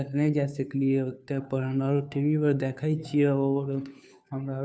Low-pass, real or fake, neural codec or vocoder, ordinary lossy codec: none; fake; codec, 16 kHz, 2 kbps, FunCodec, trained on Chinese and English, 25 frames a second; none